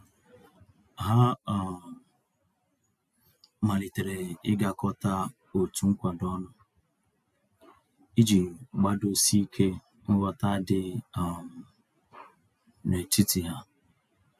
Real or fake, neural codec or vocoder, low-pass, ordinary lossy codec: real; none; 14.4 kHz; AAC, 96 kbps